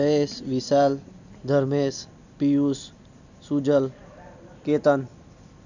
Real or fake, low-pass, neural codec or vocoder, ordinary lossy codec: real; 7.2 kHz; none; none